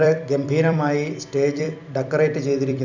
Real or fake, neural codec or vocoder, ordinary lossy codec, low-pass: fake; vocoder, 44.1 kHz, 128 mel bands every 256 samples, BigVGAN v2; none; 7.2 kHz